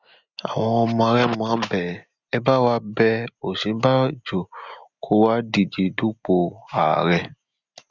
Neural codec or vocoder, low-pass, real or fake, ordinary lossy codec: none; 7.2 kHz; real; none